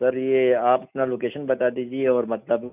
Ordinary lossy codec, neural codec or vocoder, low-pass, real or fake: none; none; 3.6 kHz; real